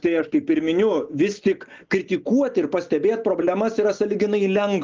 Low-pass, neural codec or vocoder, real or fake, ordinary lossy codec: 7.2 kHz; none; real; Opus, 24 kbps